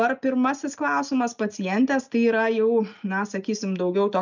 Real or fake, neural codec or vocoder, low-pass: real; none; 7.2 kHz